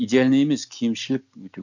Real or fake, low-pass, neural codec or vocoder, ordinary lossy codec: real; 7.2 kHz; none; none